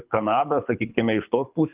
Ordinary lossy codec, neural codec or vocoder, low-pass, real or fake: Opus, 24 kbps; codec, 24 kHz, 6 kbps, HILCodec; 3.6 kHz; fake